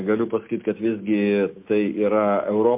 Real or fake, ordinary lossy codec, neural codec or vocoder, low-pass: real; MP3, 32 kbps; none; 3.6 kHz